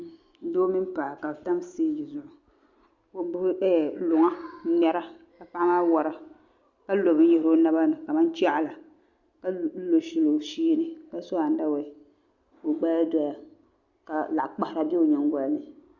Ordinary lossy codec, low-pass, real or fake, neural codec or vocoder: Opus, 64 kbps; 7.2 kHz; real; none